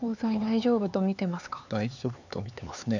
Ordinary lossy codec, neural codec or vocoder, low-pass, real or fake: none; codec, 16 kHz, 4 kbps, X-Codec, HuBERT features, trained on LibriSpeech; 7.2 kHz; fake